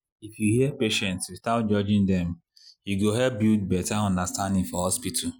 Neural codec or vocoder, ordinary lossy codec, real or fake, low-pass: none; none; real; none